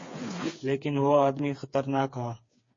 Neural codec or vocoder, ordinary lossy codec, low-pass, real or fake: codec, 16 kHz, 4 kbps, FreqCodec, smaller model; MP3, 32 kbps; 7.2 kHz; fake